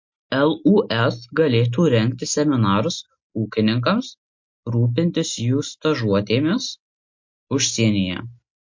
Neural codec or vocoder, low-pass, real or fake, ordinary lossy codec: none; 7.2 kHz; real; MP3, 48 kbps